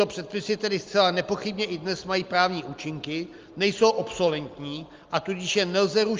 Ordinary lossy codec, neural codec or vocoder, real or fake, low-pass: Opus, 32 kbps; none; real; 7.2 kHz